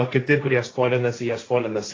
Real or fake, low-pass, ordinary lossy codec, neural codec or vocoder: fake; 7.2 kHz; AAC, 32 kbps; codec, 16 kHz, 1.1 kbps, Voila-Tokenizer